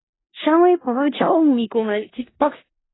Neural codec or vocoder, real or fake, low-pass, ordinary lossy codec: codec, 16 kHz in and 24 kHz out, 0.4 kbps, LongCat-Audio-Codec, four codebook decoder; fake; 7.2 kHz; AAC, 16 kbps